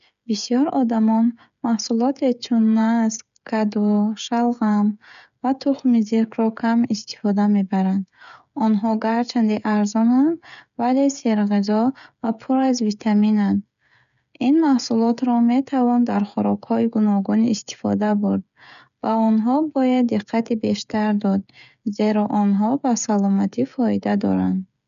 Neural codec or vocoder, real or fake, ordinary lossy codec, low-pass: codec, 16 kHz, 16 kbps, FreqCodec, smaller model; fake; none; 7.2 kHz